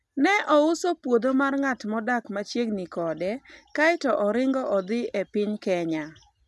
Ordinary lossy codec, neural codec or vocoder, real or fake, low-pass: none; none; real; none